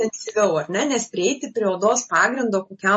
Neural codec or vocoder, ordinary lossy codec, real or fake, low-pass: none; MP3, 32 kbps; real; 10.8 kHz